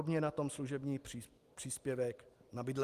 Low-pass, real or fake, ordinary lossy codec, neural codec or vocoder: 14.4 kHz; real; Opus, 24 kbps; none